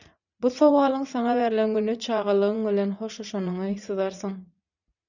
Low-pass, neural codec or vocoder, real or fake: 7.2 kHz; vocoder, 44.1 kHz, 80 mel bands, Vocos; fake